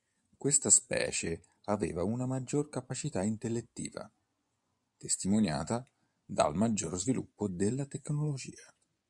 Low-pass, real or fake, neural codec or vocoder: 10.8 kHz; fake; vocoder, 24 kHz, 100 mel bands, Vocos